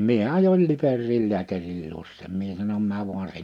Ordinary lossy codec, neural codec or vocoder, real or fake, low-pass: none; none; real; 19.8 kHz